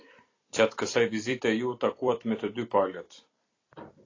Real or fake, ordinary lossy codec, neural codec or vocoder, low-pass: fake; AAC, 32 kbps; vocoder, 44.1 kHz, 128 mel bands every 256 samples, BigVGAN v2; 7.2 kHz